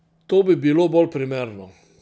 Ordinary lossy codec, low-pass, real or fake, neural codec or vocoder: none; none; real; none